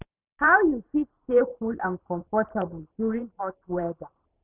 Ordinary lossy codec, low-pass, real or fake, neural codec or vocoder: none; 3.6 kHz; fake; vocoder, 44.1 kHz, 128 mel bands every 512 samples, BigVGAN v2